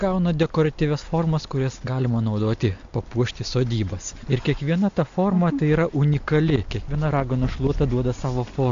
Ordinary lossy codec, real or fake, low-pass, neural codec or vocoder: AAC, 64 kbps; real; 7.2 kHz; none